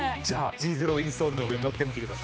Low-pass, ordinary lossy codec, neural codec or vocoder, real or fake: none; none; codec, 16 kHz, 2 kbps, X-Codec, HuBERT features, trained on general audio; fake